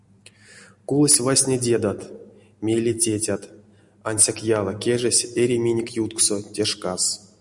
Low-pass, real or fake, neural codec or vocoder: 10.8 kHz; real; none